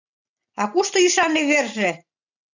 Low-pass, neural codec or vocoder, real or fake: 7.2 kHz; none; real